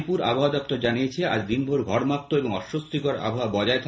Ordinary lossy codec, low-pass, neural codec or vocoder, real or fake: none; none; none; real